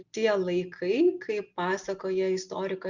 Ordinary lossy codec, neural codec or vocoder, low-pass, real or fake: Opus, 64 kbps; none; 7.2 kHz; real